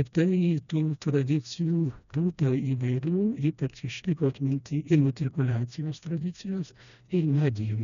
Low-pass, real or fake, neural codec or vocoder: 7.2 kHz; fake; codec, 16 kHz, 1 kbps, FreqCodec, smaller model